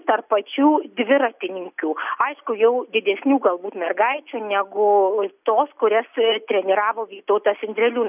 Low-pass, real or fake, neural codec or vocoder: 3.6 kHz; real; none